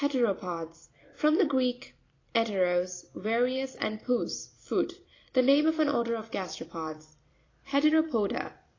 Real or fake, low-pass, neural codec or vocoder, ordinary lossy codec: fake; 7.2 kHz; vocoder, 44.1 kHz, 128 mel bands every 256 samples, BigVGAN v2; AAC, 32 kbps